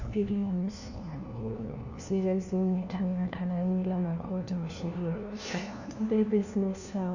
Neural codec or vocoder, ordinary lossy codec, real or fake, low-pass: codec, 16 kHz, 1 kbps, FunCodec, trained on LibriTTS, 50 frames a second; none; fake; 7.2 kHz